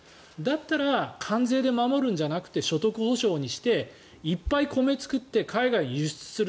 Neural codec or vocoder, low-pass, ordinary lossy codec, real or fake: none; none; none; real